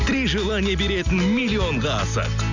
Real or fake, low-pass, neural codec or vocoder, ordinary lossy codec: real; 7.2 kHz; none; none